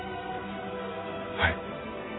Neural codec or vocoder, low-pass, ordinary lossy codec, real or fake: none; 7.2 kHz; AAC, 16 kbps; real